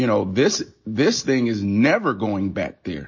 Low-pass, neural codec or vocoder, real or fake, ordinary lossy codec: 7.2 kHz; none; real; MP3, 32 kbps